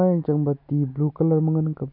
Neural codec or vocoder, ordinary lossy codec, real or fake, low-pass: none; none; real; 5.4 kHz